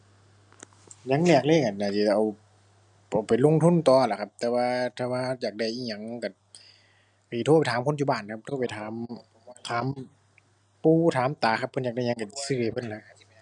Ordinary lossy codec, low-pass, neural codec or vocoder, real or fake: none; 9.9 kHz; none; real